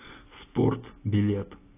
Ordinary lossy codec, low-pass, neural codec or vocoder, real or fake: AAC, 16 kbps; 3.6 kHz; none; real